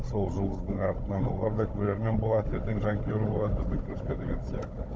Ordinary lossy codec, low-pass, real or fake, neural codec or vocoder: none; none; fake; codec, 16 kHz, 16 kbps, FunCodec, trained on Chinese and English, 50 frames a second